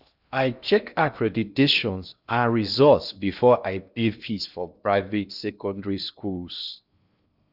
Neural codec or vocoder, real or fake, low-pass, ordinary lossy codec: codec, 16 kHz in and 24 kHz out, 0.6 kbps, FocalCodec, streaming, 2048 codes; fake; 5.4 kHz; none